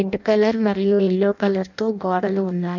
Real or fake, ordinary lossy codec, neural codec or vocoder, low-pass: fake; none; codec, 16 kHz in and 24 kHz out, 0.6 kbps, FireRedTTS-2 codec; 7.2 kHz